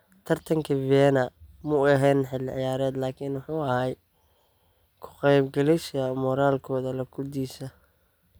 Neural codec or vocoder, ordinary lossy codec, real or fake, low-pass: none; none; real; none